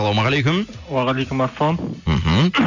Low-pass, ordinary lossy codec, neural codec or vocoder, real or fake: 7.2 kHz; none; none; real